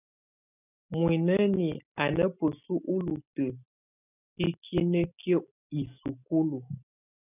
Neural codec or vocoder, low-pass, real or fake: none; 3.6 kHz; real